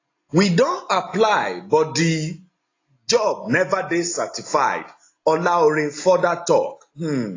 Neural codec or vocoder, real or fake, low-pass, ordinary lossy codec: none; real; 7.2 kHz; AAC, 32 kbps